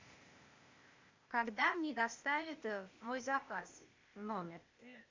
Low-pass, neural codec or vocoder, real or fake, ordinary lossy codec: 7.2 kHz; codec, 16 kHz, 0.8 kbps, ZipCodec; fake; MP3, 48 kbps